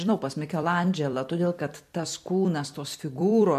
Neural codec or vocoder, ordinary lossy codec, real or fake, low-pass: vocoder, 44.1 kHz, 128 mel bands every 256 samples, BigVGAN v2; MP3, 64 kbps; fake; 14.4 kHz